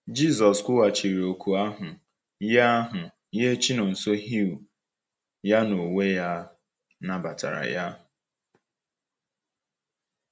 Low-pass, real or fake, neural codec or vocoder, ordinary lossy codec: none; real; none; none